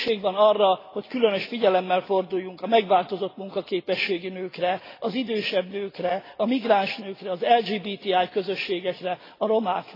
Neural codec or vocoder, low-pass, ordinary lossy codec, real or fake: none; 5.4 kHz; AAC, 24 kbps; real